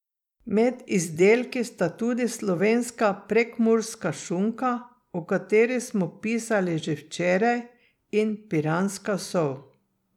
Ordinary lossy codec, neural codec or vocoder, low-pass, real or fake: none; vocoder, 44.1 kHz, 128 mel bands every 256 samples, BigVGAN v2; 19.8 kHz; fake